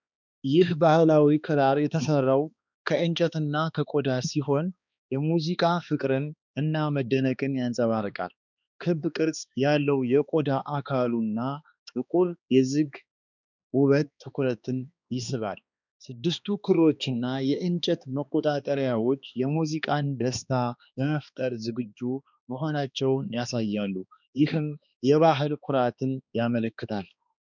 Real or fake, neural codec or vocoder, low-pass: fake; codec, 16 kHz, 2 kbps, X-Codec, HuBERT features, trained on balanced general audio; 7.2 kHz